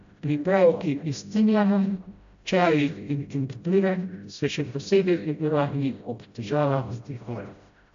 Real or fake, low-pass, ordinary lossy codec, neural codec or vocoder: fake; 7.2 kHz; AAC, 64 kbps; codec, 16 kHz, 0.5 kbps, FreqCodec, smaller model